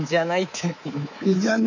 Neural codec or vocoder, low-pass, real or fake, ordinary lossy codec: codec, 24 kHz, 3.1 kbps, DualCodec; 7.2 kHz; fake; none